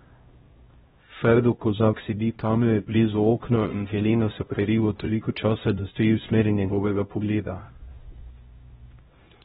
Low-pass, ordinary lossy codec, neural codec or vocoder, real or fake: 7.2 kHz; AAC, 16 kbps; codec, 16 kHz, 0.5 kbps, X-Codec, HuBERT features, trained on LibriSpeech; fake